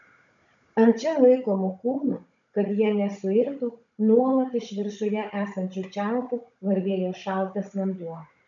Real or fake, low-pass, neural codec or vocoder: fake; 7.2 kHz; codec, 16 kHz, 16 kbps, FunCodec, trained on Chinese and English, 50 frames a second